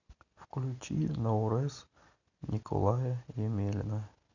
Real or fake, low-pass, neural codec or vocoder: real; 7.2 kHz; none